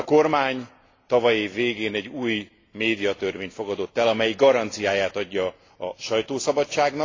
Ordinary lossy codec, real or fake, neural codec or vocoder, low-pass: AAC, 32 kbps; real; none; 7.2 kHz